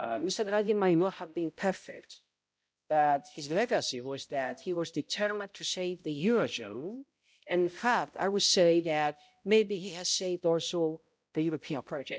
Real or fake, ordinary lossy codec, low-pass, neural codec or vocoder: fake; none; none; codec, 16 kHz, 0.5 kbps, X-Codec, HuBERT features, trained on balanced general audio